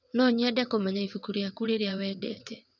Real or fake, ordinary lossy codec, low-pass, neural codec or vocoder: fake; none; 7.2 kHz; vocoder, 22.05 kHz, 80 mel bands, WaveNeXt